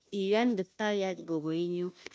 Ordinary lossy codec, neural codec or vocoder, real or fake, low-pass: none; codec, 16 kHz, 0.5 kbps, FunCodec, trained on Chinese and English, 25 frames a second; fake; none